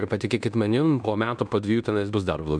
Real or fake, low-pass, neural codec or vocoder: fake; 9.9 kHz; codec, 16 kHz in and 24 kHz out, 0.9 kbps, LongCat-Audio-Codec, fine tuned four codebook decoder